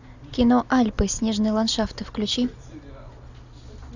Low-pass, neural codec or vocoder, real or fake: 7.2 kHz; none; real